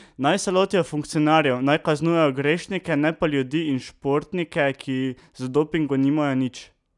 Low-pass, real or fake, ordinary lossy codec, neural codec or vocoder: 10.8 kHz; real; none; none